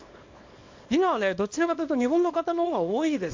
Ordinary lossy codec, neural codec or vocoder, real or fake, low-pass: MP3, 48 kbps; codec, 24 kHz, 0.9 kbps, WavTokenizer, small release; fake; 7.2 kHz